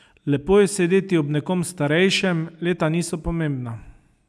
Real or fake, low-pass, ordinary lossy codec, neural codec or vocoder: real; none; none; none